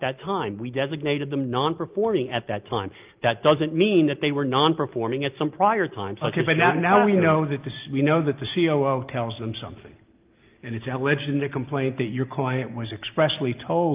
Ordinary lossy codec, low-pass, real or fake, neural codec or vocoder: Opus, 24 kbps; 3.6 kHz; real; none